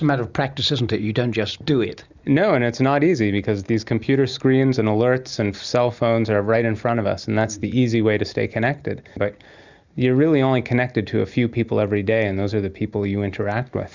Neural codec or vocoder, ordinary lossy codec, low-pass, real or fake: none; Opus, 64 kbps; 7.2 kHz; real